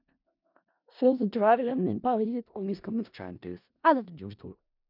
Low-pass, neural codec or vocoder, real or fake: 5.4 kHz; codec, 16 kHz in and 24 kHz out, 0.4 kbps, LongCat-Audio-Codec, four codebook decoder; fake